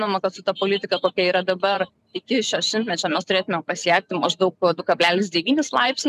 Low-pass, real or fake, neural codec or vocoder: 14.4 kHz; fake; vocoder, 44.1 kHz, 128 mel bands every 512 samples, BigVGAN v2